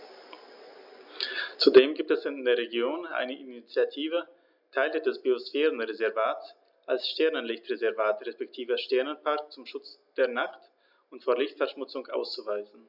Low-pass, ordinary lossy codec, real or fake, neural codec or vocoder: 5.4 kHz; none; real; none